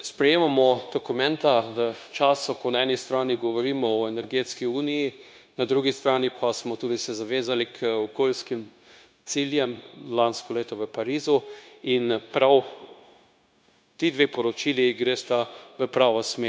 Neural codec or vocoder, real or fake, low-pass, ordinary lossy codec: codec, 16 kHz, 0.9 kbps, LongCat-Audio-Codec; fake; none; none